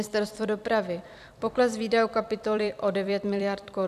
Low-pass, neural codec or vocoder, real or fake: 14.4 kHz; vocoder, 44.1 kHz, 128 mel bands every 256 samples, BigVGAN v2; fake